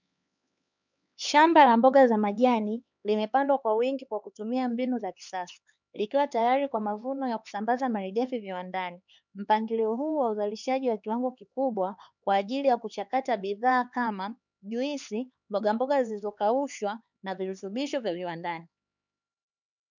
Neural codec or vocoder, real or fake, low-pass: codec, 16 kHz, 4 kbps, X-Codec, HuBERT features, trained on LibriSpeech; fake; 7.2 kHz